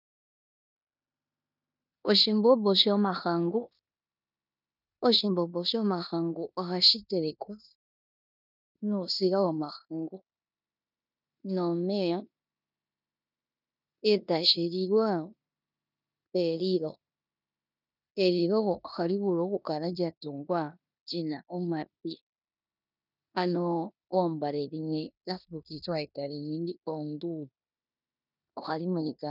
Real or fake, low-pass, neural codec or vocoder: fake; 5.4 kHz; codec, 16 kHz in and 24 kHz out, 0.9 kbps, LongCat-Audio-Codec, four codebook decoder